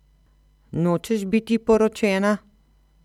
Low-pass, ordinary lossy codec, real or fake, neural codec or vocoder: 19.8 kHz; none; fake; vocoder, 44.1 kHz, 128 mel bands every 256 samples, BigVGAN v2